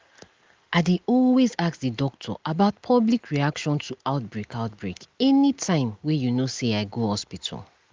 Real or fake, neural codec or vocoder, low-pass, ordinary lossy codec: real; none; 7.2 kHz; Opus, 32 kbps